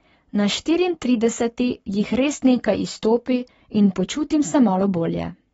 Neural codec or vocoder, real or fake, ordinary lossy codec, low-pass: codec, 44.1 kHz, 7.8 kbps, Pupu-Codec; fake; AAC, 24 kbps; 19.8 kHz